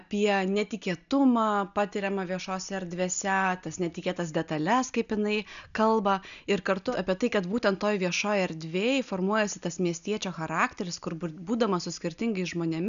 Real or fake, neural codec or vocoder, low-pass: real; none; 7.2 kHz